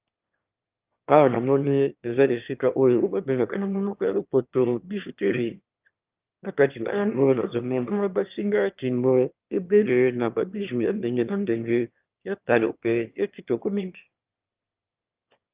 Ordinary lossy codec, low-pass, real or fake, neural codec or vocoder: Opus, 24 kbps; 3.6 kHz; fake; autoencoder, 22.05 kHz, a latent of 192 numbers a frame, VITS, trained on one speaker